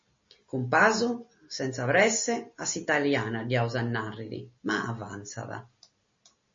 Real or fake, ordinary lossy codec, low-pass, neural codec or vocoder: real; MP3, 32 kbps; 7.2 kHz; none